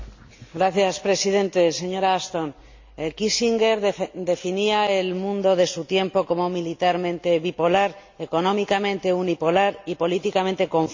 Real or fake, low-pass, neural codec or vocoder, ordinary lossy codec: real; 7.2 kHz; none; MP3, 64 kbps